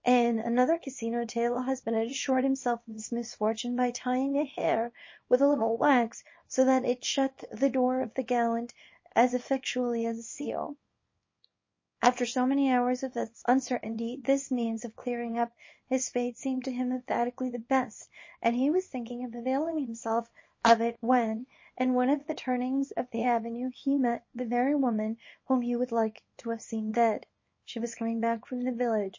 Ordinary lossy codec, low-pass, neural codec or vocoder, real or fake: MP3, 32 kbps; 7.2 kHz; codec, 24 kHz, 0.9 kbps, WavTokenizer, small release; fake